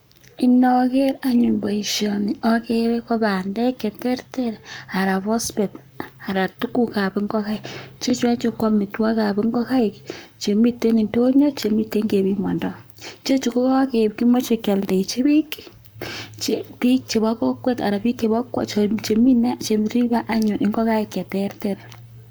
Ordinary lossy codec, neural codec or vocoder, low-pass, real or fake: none; codec, 44.1 kHz, 7.8 kbps, Pupu-Codec; none; fake